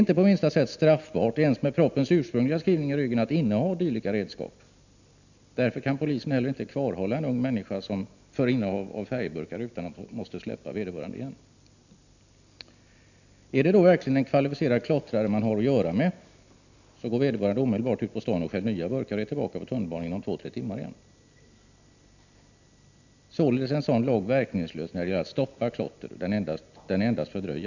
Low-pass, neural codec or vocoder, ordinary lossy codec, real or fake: 7.2 kHz; none; none; real